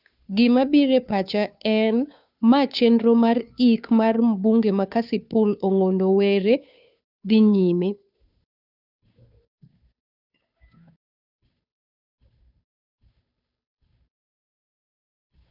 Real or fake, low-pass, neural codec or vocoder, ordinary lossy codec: fake; 5.4 kHz; codec, 16 kHz, 2 kbps, FunCodec, trained on Chinese and English, 25 frames a second; none